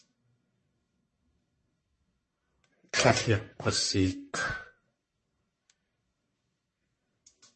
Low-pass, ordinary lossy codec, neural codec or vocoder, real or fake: 10.8 kHz; MP3, 32 kbps; codec, 44.1 kHz, 1.7 kbps, Pupu-Codec; fake